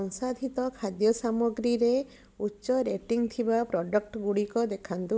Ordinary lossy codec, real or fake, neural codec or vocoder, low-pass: none; real; none; none